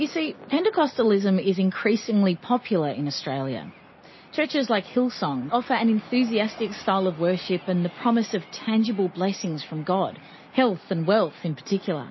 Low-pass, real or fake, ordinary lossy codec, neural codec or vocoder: 7.2 kHz; real; MP3, 24 kbps; none